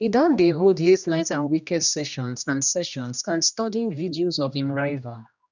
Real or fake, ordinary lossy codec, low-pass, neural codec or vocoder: fake; none; 7.2 kHz; codec, 16 kHz, 1 kbps, X-Codec, HuBERT features, trained on general audio